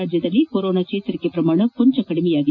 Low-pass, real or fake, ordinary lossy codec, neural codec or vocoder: 7.2 kHz; real; none; none